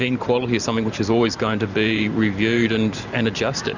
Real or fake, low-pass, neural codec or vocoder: fake; 7.2 kHz; vocoder, 44.1 kHz, 128 mel bands every 512 samples, BigVGAN v2